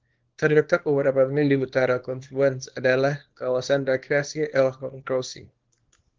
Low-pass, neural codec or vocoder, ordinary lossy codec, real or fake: 7.2 kHz; codec, 24 kHz, 0.9 kbps, WavTokenizer, small release; Opus, 16 kbps; fake